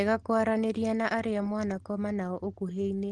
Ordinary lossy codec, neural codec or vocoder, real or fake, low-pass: Opus, 16 kbps; none; real; 10.8 kHz